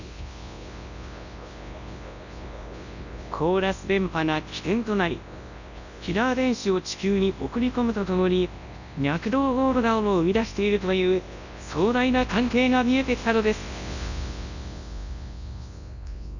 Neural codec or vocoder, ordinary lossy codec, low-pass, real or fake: codec, 24 kHz, 0.9 kbps, WavTokenizer, large speech release; none; 7.2 kHz; fake